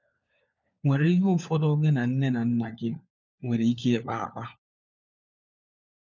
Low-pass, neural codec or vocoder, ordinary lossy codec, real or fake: 7.2 kHz; codec, 16 kHz, 4 kbps, FunCodec, trained on LibriTTS, 50 frames a second; none; fake